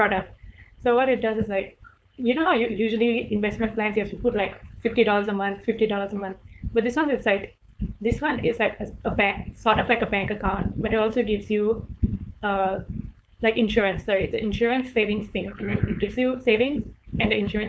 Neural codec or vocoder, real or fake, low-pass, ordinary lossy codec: codec, 16 kHz, 4.8 kbps, FACodec; fake; none; none